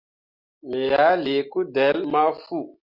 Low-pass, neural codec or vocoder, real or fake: 5.4 kHz; none; real